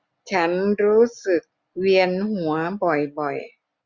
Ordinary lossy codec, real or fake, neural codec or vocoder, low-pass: Opus, 64 kbps; real; none; 7.2 kHz